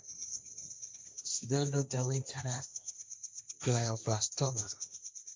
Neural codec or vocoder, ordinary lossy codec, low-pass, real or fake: codec, 16 kHz, 1.1 kbps, Voila-Tokenizer; none; none; fake